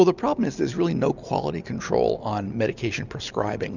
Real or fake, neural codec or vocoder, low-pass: real; none; 7.2 kHz